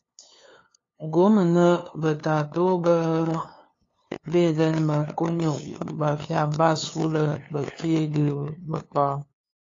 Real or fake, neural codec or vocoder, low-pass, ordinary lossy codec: fake; codec, 16 kHz, 2 kbps, FunCodec, trained on LibriTTS, 25 frames a second; 7.2 kHz; AAC, 32 kbps